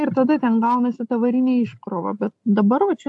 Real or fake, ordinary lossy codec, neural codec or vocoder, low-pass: real; AAC, 64 kbps; none; 10.8 kHz